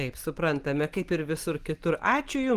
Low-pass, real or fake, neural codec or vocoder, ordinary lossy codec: 14.4 kHz; real; none; Opus, 24 kbps